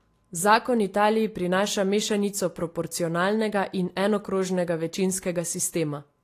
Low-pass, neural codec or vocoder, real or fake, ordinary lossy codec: 14.4 kHz; none; real; AAC, 48 kbps